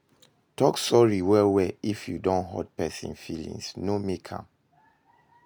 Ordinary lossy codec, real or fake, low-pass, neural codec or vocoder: none; real; none; none